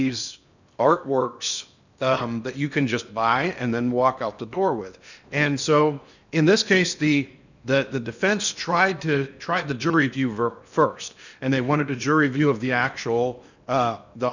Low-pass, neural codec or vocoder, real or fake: 7.2 kHz; codec, 16 kHz in and 24 kHz out, 0.8 kbps, FocalCodec, streaming, 65536 codes; fake